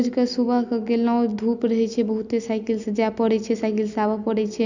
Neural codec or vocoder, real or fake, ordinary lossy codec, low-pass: none; real; none; 7.2 kHz